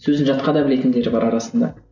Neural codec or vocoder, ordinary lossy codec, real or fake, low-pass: none; none; real; 7.2 kHz